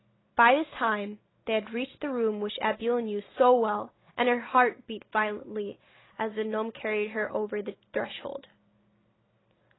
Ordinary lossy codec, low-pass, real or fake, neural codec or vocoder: AAC, 16 kbps; 7.2 kHz; real; none